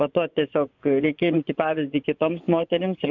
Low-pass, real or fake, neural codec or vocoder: 7.2 kHz; fake; vocoder, 24 kHz, 100 mel bands, Vocos